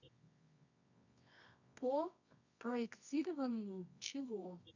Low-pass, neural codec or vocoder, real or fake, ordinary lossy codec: 7.2 kHz; codec, 24 kHz, 0.9 kbps, WavTokenizer, medium music audio release; fake; none